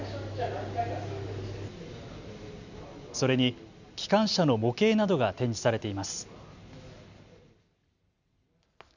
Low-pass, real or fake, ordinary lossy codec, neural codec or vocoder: 7.2 kHz; real; none; none